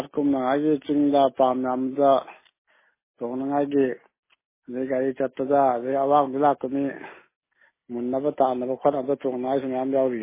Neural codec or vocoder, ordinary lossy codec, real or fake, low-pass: none; MP3, 16 kbps; real; 3.6 kHz